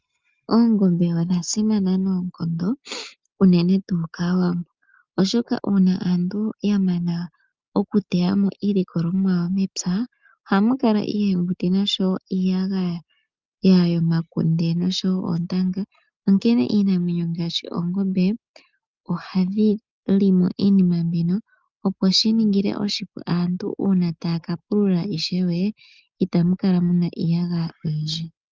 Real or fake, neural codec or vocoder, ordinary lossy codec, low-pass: real; none; Opus, 32 kbps; 7.2 kHz